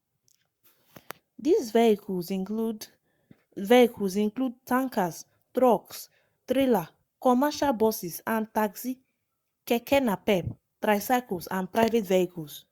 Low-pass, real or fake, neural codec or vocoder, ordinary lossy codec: 19.8 kHz; fake; codec, 44.1 kHz, 7.8 kbps, Pupu-Codec; Opus, 64 kbps